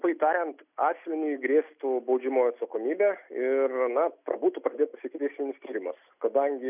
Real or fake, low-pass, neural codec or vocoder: real; 3.6 kHz; none